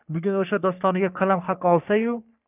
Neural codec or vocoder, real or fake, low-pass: codec, 44.1 kHz, 3.4 kbps, Pupu-Codec; fake; 3.6 kHz